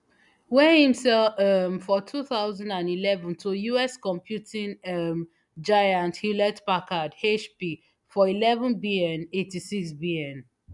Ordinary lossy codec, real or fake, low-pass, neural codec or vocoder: none; real; 10.8 kHz; none